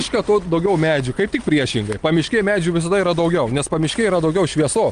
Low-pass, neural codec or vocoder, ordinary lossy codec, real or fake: 9.9 kHz; none; Opus, 32 kbps; real